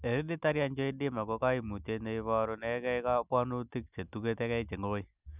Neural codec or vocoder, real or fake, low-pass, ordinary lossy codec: none; real; 3.6 kHz; none